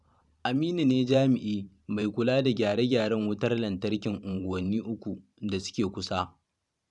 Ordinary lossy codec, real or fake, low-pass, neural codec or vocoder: none; fake; 10.8 kHz; vocoder, 44.1 kHz, 128 mel bands every 512 samples, BigVGAN v2